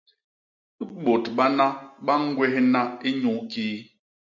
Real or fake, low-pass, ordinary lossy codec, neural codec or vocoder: real; 7.2 kHz; MP3, 64 kbps; none